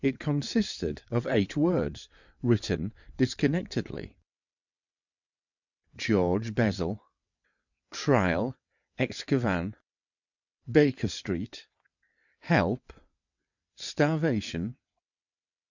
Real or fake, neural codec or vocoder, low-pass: fake; vocoder, 22.05 kHz, 80 mel bands, WaveNeXt; 7.2 kHz